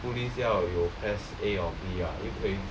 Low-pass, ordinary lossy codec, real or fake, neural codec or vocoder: none; none; real; none